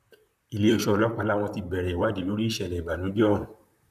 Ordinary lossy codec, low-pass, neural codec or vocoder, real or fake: none; 14.4 kHz; vocoder, 44.1 kHz, 128 mel bands, Pupu-Vocoder; fake